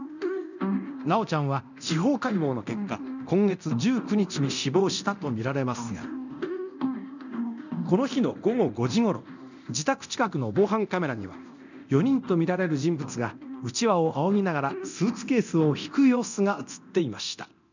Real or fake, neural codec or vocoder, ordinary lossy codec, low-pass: fake; codec, 24 kHz, 0.9 kbps, DualCodec; none; 7.2 kHz